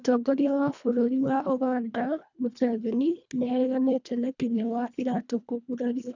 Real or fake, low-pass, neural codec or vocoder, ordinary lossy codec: fake; 7.2 kHz; codec, 24 kHz, 1.5 kbps, HILCodec; none